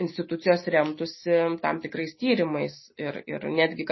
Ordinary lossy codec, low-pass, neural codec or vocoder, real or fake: MP3, 24 kbps; 7.2 kHz; none; real